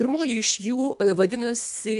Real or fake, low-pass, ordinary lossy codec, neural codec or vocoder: fake; 10.8 kHz; MP3, 96 kbps; codec, 24 kHz, 1.5 kbps, HILCodec